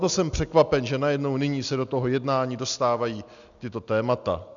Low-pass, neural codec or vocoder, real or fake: 7.2 kHz; none; real